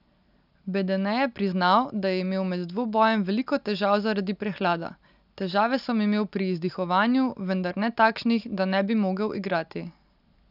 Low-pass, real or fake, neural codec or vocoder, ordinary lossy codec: 5.4 kHz; real; none; none